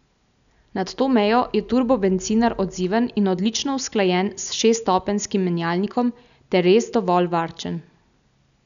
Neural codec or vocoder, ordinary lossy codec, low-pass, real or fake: none; none; 7.2 kHz; real